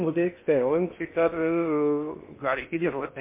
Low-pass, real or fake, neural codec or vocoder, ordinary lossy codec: 3.6 kHz; fake; codec, 16 kHz in and 24 kHz out, 0.8 kbps, FocalCodec, streaming, 65536 codes; MP3, 24 kbps